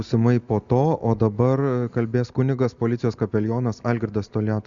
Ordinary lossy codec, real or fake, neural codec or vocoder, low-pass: Opus, 64 kbps; real; none; 7.2 kHz